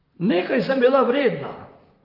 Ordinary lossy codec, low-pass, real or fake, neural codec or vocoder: Opus, 24 kbps; 5.4 kHz; fake; vocoder, 44.1 kHz, 128 mel bands, Pupu-Vocoder